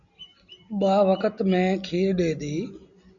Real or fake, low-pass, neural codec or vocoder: real; 7.2 kHz; none